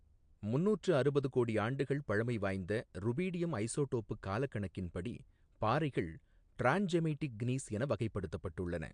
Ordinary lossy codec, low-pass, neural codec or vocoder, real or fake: MP3, 64 kbps; 9.9 kHz; none; real